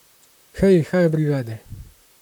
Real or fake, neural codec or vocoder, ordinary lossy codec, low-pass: fake; vocoder, 44.1 kHz, 128 mel bands, Pupu-Vocoder; none; 19.8 kHz